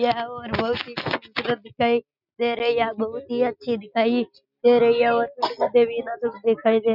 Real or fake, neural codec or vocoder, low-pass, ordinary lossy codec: real; none; 5.4 kHz; none